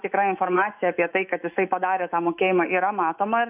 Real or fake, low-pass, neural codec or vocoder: fake; 3.6 kHz; autoencoder, 48 kHz, 128 numbers a frame, DAC-VAE, trained on Japanese speech